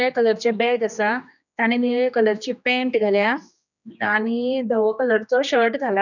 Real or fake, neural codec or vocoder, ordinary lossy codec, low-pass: fake; codec, 16 kHz, 2 kbps, X-Codec, HuBERT features, trained on general audio; none; 7.2 kHz